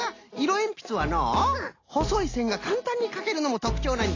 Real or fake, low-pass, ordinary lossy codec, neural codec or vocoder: real; 7.2 kHz; AAC, 32 kbps; none